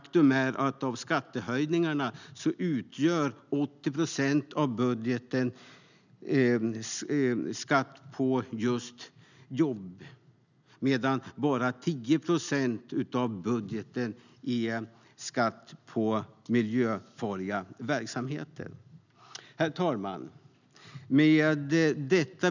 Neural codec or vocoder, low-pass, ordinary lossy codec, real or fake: none; 7.2 kHz; none; real